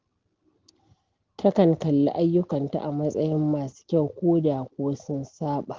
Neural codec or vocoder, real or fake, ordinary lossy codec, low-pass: none; real; Opus, 16 kbps; 7.2 kHz